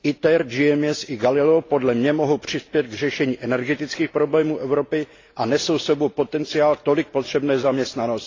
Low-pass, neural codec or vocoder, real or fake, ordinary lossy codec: 7.2 kHz; none; real; AAC, 32 kbps